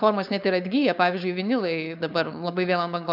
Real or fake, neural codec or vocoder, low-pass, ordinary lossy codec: fake; codec, 16 kHz, 4.8 kbps, FACodec; 5.4 kHz; AAC, 48 kbps